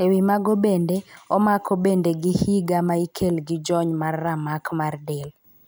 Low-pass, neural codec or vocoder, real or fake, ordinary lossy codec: none; none; real; none